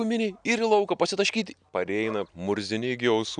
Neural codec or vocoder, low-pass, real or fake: none; 9.9 kHz; real